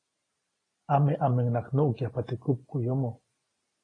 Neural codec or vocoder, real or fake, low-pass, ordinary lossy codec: none; real; 9.9 kHz; AAC, 32 kbps